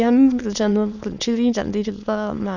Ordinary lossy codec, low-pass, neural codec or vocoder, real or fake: none; 7.2 kHz; autoencoder, 22.05 kHz, a latent of 192 numbers a frame, VITS, trained on many speakers; fake